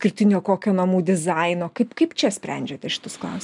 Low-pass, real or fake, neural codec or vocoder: 10.8 kHz; real; none